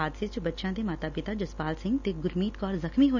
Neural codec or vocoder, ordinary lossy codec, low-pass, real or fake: none; none; 7.2 kHz; real